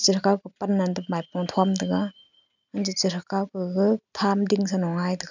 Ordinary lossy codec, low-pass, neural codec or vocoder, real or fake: none; 7.2 kHz; none; real